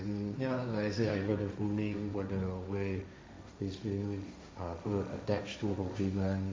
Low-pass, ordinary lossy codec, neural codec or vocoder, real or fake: none; none; codec, 16 kHz, 1.1 kbps, Voila-Tokenizer; fake